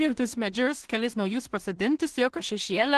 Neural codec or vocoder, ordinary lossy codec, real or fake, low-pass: codec, 16 kHz in and 24 kHz out, 0.4 kbps, LongCat-Audio-Codec, two codebook decoder; Opus, 16 kbps; fake; 10.8 kHz